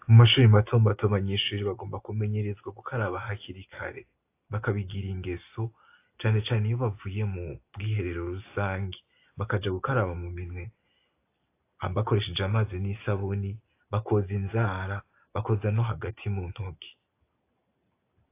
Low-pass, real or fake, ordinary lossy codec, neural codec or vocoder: 3.6 kHz; real; AAC, 24 kbps; none